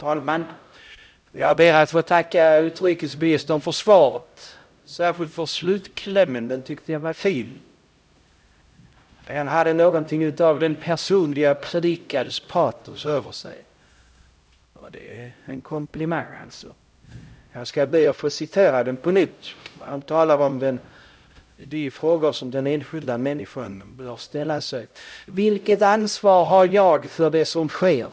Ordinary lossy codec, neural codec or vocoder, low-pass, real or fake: none; codec, 16 kHz, 0.5 kbps, X-Codec, HuBERT features, trained on LibriSpeech; none; fake